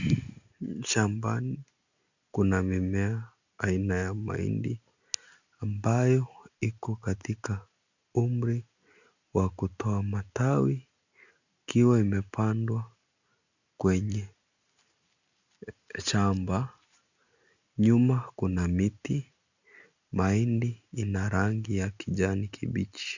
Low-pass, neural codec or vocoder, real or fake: 7.2 kHz; none; real